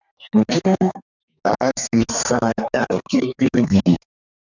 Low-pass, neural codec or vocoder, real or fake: 7.2 kHz; codec, 32 kHz, 1.9 kbps, SNAC; fake